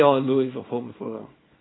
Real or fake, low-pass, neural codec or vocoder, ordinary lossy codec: fake; 7.2 kHz; codec, 24 kHz, 0.9 kbps, WavTokenizer, small release; AAC, 16 kbps